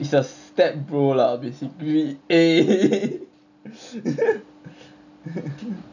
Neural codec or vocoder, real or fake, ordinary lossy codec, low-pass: none; real; none; 7.2 kHz